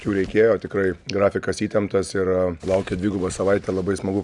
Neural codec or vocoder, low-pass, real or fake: none; 10.8 kHz; real